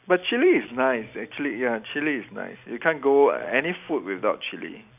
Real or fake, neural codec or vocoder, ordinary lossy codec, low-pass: real; none; none; 3.6 kHz